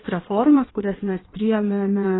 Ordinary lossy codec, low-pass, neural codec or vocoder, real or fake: AAC, 16 kbps; 7.2 kHz; codec, 16 kHz in and 24 kHz out, 1.1 kbps, FireRedTTS-2 codec; fake